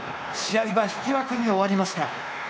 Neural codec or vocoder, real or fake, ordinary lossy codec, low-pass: codec, 16 kHz, 2 kbps, X-Codec, WavLM features, trained on Multilingual LibriSpeech; fake; none; none